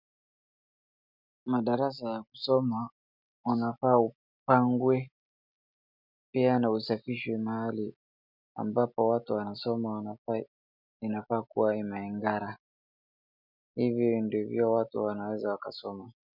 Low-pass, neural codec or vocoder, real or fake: 5.4 kHz; none; real